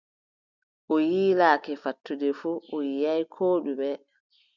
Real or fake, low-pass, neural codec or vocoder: real; 7.2 kHz; none